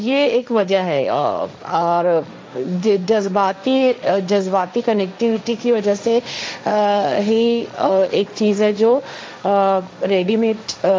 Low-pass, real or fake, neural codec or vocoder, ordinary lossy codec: none; fake; codec, 16 kHz, 1.1 kbps, Voila-Tokenizer; none